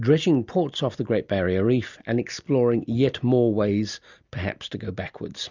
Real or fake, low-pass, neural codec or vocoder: real; 7.2 kHz; none